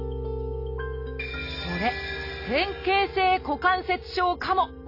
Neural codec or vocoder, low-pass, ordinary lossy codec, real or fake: none; 5.4 kHz; AAC, 32 kbps; real